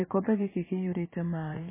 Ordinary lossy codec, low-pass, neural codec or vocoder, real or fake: MP3, 16 kbps; 3.6 kHz; vocoder, 44.1 kHz, 80 mel bands, Vocos; fake